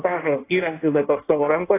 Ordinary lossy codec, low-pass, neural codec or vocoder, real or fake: AAC, 24 kbps; 3.6 kHz; codec, 16 kHz in and 24 kHz out, 1.1 kbps, FireRedTTS-2 codec; fake